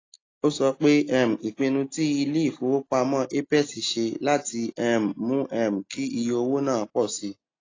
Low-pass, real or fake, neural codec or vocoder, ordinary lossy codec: 7.2 kHz; real; none; AAC, 32 kbps